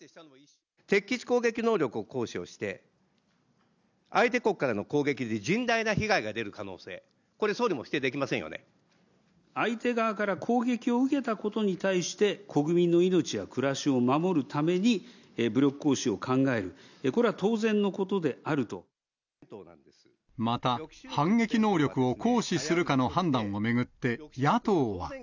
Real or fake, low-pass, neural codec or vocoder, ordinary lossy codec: real; 7.2 kHz; none; none